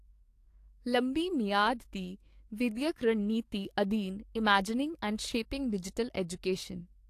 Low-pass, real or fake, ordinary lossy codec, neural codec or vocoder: 14.4 kHz; fake; AAC, 64 kbps; codec, 44.1 kHz, 7.8 kbps, DAC